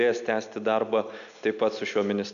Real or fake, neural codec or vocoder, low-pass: real; none; 7.2 kHz